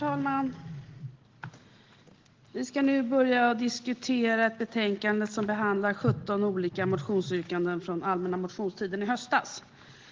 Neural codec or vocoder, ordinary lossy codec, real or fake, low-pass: none; Opus, 16 kbps; real; 7.2 kHz